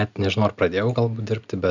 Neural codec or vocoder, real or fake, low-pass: none; real; 7.2 kHz